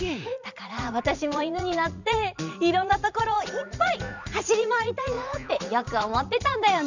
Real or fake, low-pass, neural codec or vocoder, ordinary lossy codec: fake; 7.2 kHz; vocoder, 44.1 kHz, 80 mel bands, Vocos; none